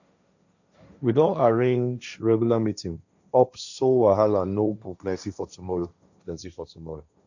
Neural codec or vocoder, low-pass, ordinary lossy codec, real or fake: codec, 16 kHz, 1.1 kbps, Voila-Tokenizer; 7.2 kHz; none; fake